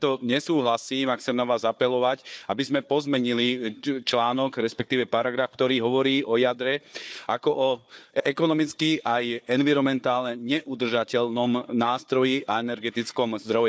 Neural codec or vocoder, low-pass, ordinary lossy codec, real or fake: codec, 16 kHz, 4 kbps, FunCodec, trained on Chinese and English, 50 frames a second; none; none; fake